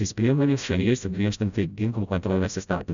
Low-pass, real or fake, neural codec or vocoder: 7.2 kHz; fake; codec, 16 kHz, 0.5 kbps, FreqCodec, smaller model